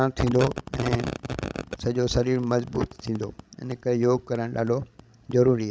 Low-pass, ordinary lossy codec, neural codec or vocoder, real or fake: none; none; codec, 16 kHz, 16 kbps, FreqCodec, larger model; fake